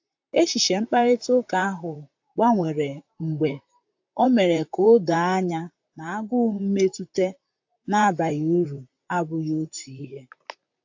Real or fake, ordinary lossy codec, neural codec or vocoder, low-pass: fake; AAC, 48 kbps; vocoder, 44.1 kHz, 128 mel bands, Pupu-Vocoder; 7.2 kHz